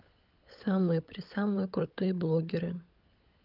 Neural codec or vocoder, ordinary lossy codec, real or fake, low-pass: codec, 16 kHz, 16 kbps, FunCodec, trained on LibriTTS, 50 frames a second; Opus, 24 kbps; fake; 5.4 kHz